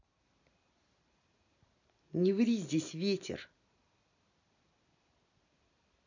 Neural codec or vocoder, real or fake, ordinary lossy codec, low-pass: none; real; none; 7.2 kHz